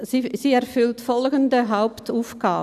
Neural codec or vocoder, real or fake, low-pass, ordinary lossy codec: none; real; 14.4 kHz; none